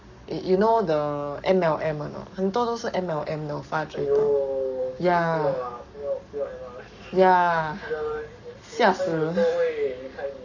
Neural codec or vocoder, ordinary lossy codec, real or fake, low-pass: codec, 44.1 kHz, 7.8 kbps, Pupu-Codec; none; fake; 7.2 kHz